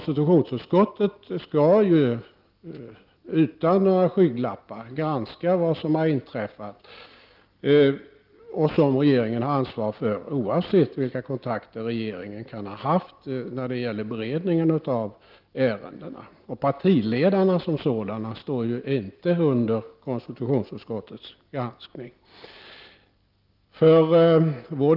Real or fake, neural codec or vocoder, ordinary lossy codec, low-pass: real; none; Opus, 32 kbps; 5.4 kHz